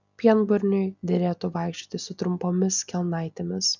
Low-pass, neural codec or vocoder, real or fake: 7.2 kHz; none; real